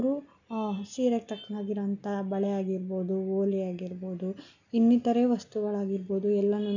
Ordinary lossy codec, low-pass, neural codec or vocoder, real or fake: none; 7.2 kHz; none; real